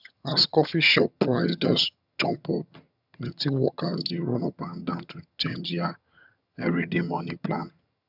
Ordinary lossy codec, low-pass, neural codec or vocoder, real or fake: none; 5.4 kHz; vocoder, 22.05 kHz, 80 mel bands, HiFi-GAN; fake